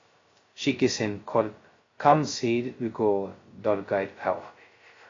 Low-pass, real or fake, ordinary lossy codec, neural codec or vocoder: 7.2 kHz; fake; AAC, 32 kbps; codec, 16 kHz, 0.2 kbps, FocalCodec